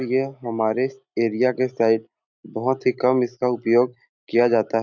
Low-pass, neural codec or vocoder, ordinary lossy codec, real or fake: 7.2 kHz; none; none; real